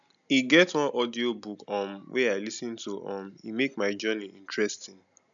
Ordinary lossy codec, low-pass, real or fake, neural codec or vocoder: none; 7.2 kHz; real; none